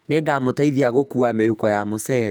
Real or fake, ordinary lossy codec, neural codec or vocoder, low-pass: fake; none; codec, 44.1 kHz, 2.6 kbps, SNAC; none